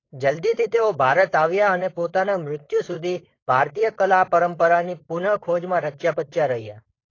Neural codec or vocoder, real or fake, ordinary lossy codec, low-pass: codec, 16 kHz, 4.8 kbps, FACodec; fake; AAC, 32 kbps; 7.2 kHz